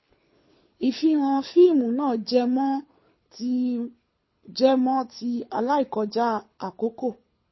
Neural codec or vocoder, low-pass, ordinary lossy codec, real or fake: codec, 24 kHz, 6 kbps, HILCodec; 7.2 kHz; MP3, 24 kbps; fake